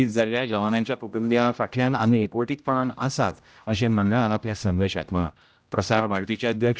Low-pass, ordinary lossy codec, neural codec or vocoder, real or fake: none; none; codec, 16 kHz, 0.5 kbps, X-Codec, HuBERT features, trained on general audio; fake